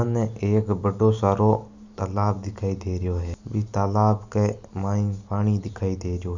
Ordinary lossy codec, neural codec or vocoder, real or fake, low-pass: Opus, 64 kbps; none; real; 7.2 kHz